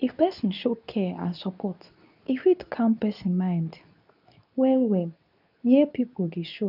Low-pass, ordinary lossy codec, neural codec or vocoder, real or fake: 5.4 kHz; none; codec, 24 kHz, 0.9 kbps, WavTokenizer, medium speech release version 2; fake